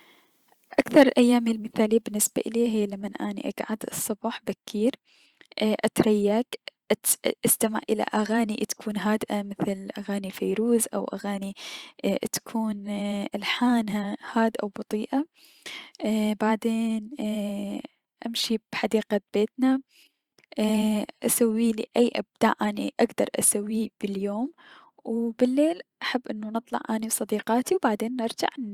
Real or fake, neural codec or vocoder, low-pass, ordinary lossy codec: fake; vocoder, 44.1 kHz, 128 mel bands every 512 samples, BigVGAN v2; 19.8 kHz; Opus, 64 kbps